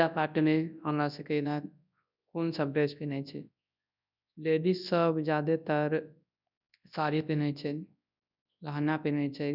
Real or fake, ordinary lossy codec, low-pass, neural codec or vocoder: fake; none; 5.4 kHz; codec, 24 kHz, 0.9 kbps, WavTokenizer, large speech release